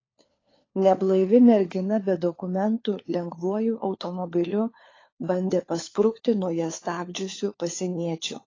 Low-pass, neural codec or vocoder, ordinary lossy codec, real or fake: 7.2 kHz; codec, 16 kHz, 4 kbps, FunCodec, trained on LibriTTS, 50 frames a second; AAC, 32 kbps; fake